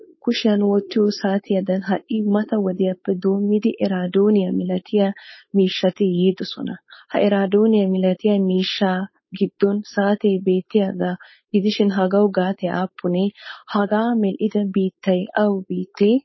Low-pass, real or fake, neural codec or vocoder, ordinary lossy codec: 7.2 kHz; fake; codec, 16 kHz, 4.8 kbps, FACodec; MP3, 24 kbps